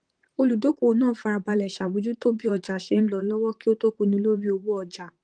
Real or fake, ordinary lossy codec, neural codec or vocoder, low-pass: fake; Opus, 24 kbps; vocoder, 44.1 kHz, 128 mel bands, Pupu-Vocoder; 9.9 kHz